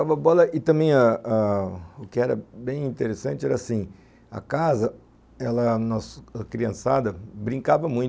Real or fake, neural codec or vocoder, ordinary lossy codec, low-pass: real; none; none; none